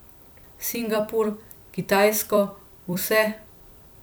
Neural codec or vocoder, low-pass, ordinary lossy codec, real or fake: vocoder, 44.1 kHz, 128 mel bands every 256 samples, BigVGAN v2; none; none; fake